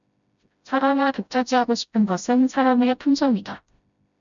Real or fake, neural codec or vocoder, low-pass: fake; codec, 16 kHz, 0.5 kbps, FreqCodec, smaller model; 7.2 kHz